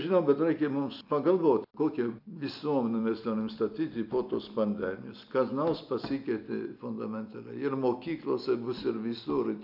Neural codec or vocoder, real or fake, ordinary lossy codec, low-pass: none; real; AAC, 48 kbps; 5.4 kHz